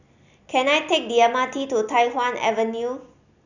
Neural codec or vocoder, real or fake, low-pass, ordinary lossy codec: none; real; 7.2 kHz; none